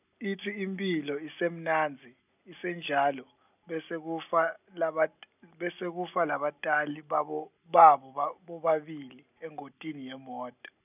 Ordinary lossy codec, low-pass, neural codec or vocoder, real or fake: none; 3.6 kHz; none; real